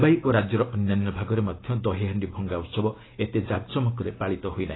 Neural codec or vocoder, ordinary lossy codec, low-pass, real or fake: none; AAC, 16 kbps; 7.2 kHz; real